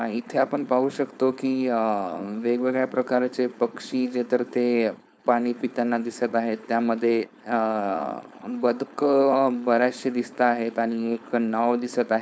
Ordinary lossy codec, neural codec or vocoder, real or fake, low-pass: none; codec, 16 kHz, 4.8 kbps, FACodec; fake; none